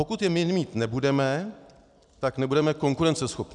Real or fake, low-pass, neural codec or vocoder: real; 10.8 kHz; none